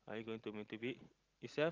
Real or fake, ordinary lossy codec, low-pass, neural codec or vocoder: real; Opus, 32 kbps; 7.2 kHz; none